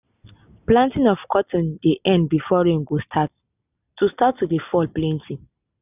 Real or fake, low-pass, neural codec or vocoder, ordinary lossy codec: real; 3.6 kHz; none; none